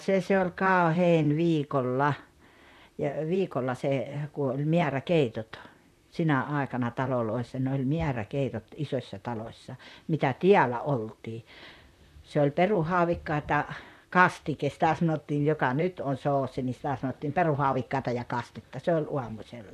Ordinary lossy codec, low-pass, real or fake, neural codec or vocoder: none; 14.4 kHz; fake; vocoder, 44.1 kHz, 128 mel bands, Pupu-Vocoder